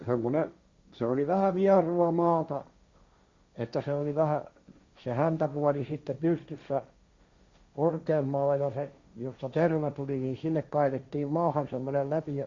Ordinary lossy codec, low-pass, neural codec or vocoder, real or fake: Opus, 64 kbps; 7.2 kHz; codec, 16 kHz, 1.1 kbps, Voila-Tokenizer; fake